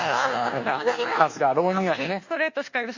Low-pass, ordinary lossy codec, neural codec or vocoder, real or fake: 7.2 kHz; Opus, 64 kbps; codec, 24 kHz, 1.2 kbps, DualCodec; fake